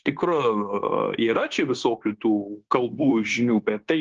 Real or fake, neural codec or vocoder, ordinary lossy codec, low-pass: fake; codec, 16 kHz, 0.9 kbps, LongCat-Audio-Codec; Opus, 16 kbps; 7.2 kHz